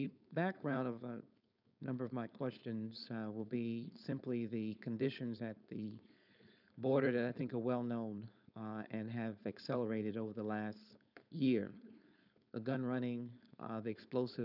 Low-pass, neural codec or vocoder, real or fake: 5.4 kHz; codec, 16 kHz, 4.8 kbps, FACodec; fake